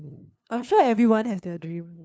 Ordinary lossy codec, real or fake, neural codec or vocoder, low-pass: none; fake; codec, 16 kHz, 2 kbps, FunCodec, trained on LibriTTS, 25 frames a second; none